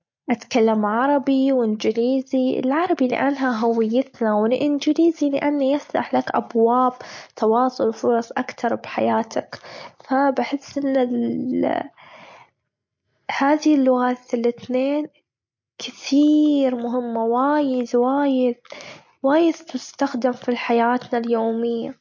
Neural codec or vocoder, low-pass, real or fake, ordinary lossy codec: none; 7.2 kHz; real; MP3, 48 kbps